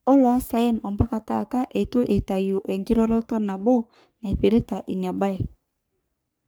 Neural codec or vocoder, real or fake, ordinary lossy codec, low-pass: codec, 44.1 kHz, 3.4 kbps, Pupu-Codec; fake; none; none